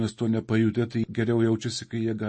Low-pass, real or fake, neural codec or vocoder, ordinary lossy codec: 10.8 kHz; real; none; MP3, 32 kbps